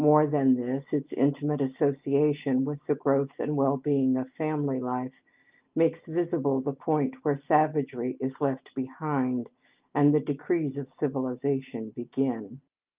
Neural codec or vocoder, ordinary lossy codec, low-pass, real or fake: none; Opus, 24 kbps; 3.6 kHz; real